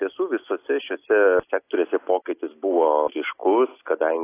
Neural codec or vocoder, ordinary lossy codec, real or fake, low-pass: none; AAC, 24 kbps; real; 3.6 kHz